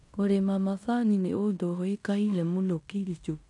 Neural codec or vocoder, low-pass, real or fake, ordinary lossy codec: codec, 16 kHz in and 24 kHz out, 0.9 kbps, LongCat-Audio-Codec, fine tuned four codebook decoder; 10.8 kHz; fake; none